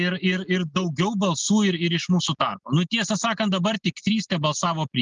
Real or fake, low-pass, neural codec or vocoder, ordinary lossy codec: real; 7.2 kHz; none; Opus, 32 kbps